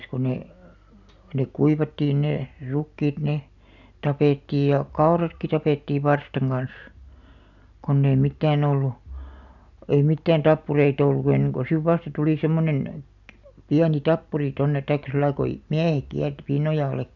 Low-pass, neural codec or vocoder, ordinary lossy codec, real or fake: 7.2 kHz; none; none; real